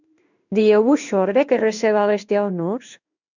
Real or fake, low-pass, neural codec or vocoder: fake; 7.2 kHz; codec, 16 kHz in and 24 kHz out, 1 kbps, XY-Tokenizer